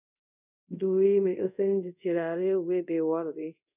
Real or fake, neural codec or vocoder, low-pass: fake; codec, 24 kHz, 0.5 kbps, DualCodec; 3.6 kHz